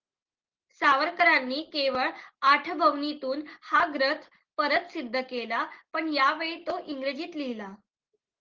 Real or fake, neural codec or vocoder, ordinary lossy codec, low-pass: real; none; Opus, 16 kbps; 7.2 kHz